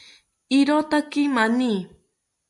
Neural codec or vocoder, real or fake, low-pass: none; real; 10.8 kHz